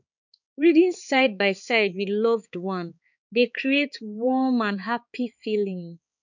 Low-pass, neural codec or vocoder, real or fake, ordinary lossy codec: 7.2 kHz; codec, 16 kHz, 4 kbps, X-Codec, HuBERT features, trained on balanced general audio; fake; none